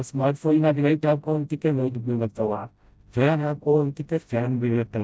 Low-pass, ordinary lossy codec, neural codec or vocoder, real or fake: none; none; codec, 16 kHz, 0.5 kbps, FreqCodec, smaller model; fake